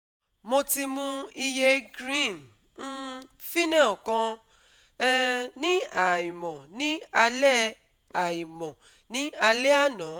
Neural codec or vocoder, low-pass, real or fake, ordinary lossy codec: vocoder, 48 kHz, 128 mel bands, Vocos; none; fake; none